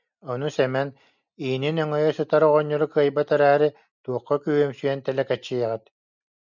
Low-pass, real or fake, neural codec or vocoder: 7.2 kHz; real; none